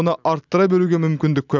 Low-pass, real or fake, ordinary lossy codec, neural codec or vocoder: 7.2 kHz; real; none; none